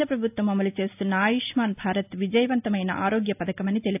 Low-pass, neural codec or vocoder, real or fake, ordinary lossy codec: 3.6 kHz; none; real; AAC, 32 kbps